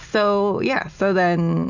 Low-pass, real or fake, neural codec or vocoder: 7.2 kHz; real; none